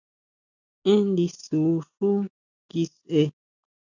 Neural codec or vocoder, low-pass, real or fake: none; 7.2 kHz; real